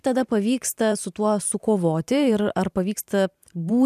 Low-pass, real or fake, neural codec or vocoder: 14.4 kHz; fake; vocoder, 44.1 kHz, 128 mel bands every 512 samples, BigVGAN v2